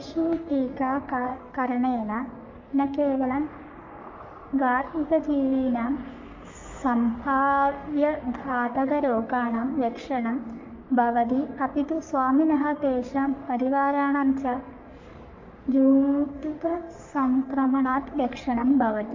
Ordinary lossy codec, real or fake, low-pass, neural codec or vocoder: MP3, 48 kbps; fake; 7.2 kHz; codec, 44.1 kHz, 3.4 kbps, Pupu-Codec